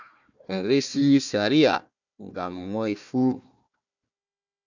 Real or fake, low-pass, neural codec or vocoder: fake; 7.2 kHz; codec, 16 kHz, 1 kbps, FunCodec, trained on Chinese and English, 50 frames a second